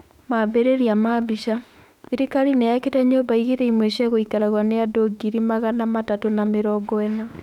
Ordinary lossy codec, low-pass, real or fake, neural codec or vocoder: none; 19.8 kHz; fake; autoencoder, 48 kHz, 32 numbers a frame, DAC-VAE, trained on Japanese speech